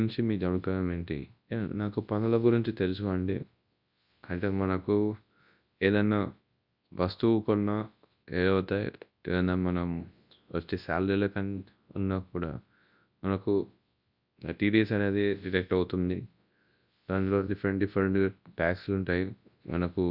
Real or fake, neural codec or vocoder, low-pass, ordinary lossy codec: fake; codec, 24 kHz, 0.9 kbps, WavTokenizer, large speech release; 5.4 kHz; Opus, 64 kbps